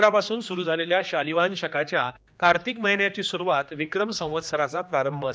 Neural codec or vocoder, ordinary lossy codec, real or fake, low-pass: codec, 16 kHz, 2 kbps, X-Codec, HuBERT features, trained on general audio; none; fake; none